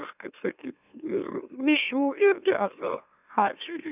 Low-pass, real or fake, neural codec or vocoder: 3.6 kHz; fake; autoencoder, 44.1 kHz, a latent of 192 numbers a frame, MeloTTS